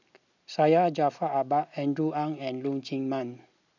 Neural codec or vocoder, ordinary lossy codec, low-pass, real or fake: none; none; 7.2 kHz; real